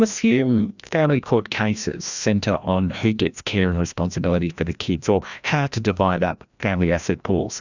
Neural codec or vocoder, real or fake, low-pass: codec, 16 kHz, 1 kbps, FreqCodec, larger model; fake; 7.2 kHz